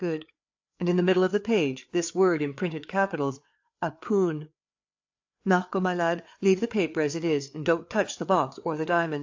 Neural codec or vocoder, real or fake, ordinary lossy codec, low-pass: codec, 16 kHz, 4 kbps, FreqCodec, larger model; fake; AAC, 48 kbps; 7.2 kHz